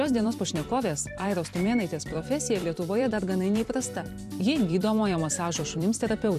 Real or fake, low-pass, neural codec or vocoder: fake; 14.4 kHz; vocoder, 44.1 kHz, 128 mel bands every 256 samples, BigVGAN v2